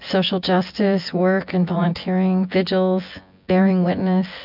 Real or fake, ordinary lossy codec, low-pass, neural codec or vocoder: fake; MP3, 48 kbps; 5.4 kHz; vocoder, 24 kHz, 100 mel bands, Vocos